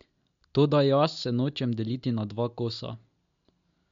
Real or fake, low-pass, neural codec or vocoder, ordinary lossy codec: real; 7.2 kHz; none; MP3, 64 kbps